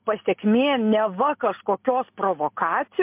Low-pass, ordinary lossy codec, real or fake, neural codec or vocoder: 3.6 kHz; MP3, 32 kbps; real; none